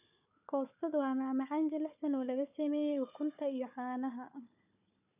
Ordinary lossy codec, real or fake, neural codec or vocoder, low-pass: none; fake; codec, 16 kHz, 4 kbps, FunCodec, trained on Chinese and English, 50 frames a second; 3.6 kHz